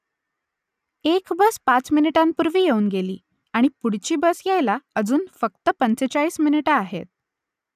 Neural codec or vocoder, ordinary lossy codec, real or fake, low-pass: none; none; real; 14.4 kHz